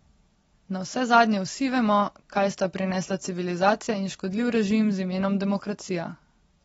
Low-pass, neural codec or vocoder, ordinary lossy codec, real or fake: 14.4 kHz; none; AAC, 24 kbps; real